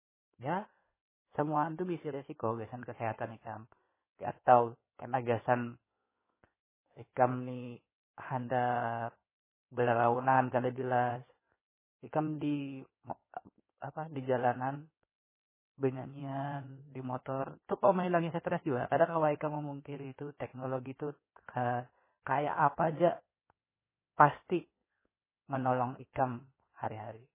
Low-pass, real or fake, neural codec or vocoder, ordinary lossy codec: 3.6 kHz; fake; codec, 16 kHz in and 24 kHz out, 2.2 kbps, FireRedTTS-2 codec; MP3, 16 kbps